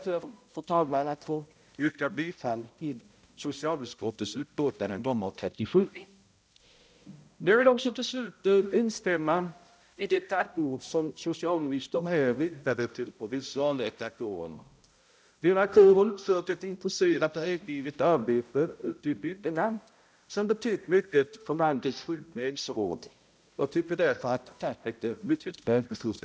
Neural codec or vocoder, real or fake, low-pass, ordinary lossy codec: codec, 16 kHz, 0.5 kbps, X-Codec, HuBERT features, trained on balanced general audio; fake; none; none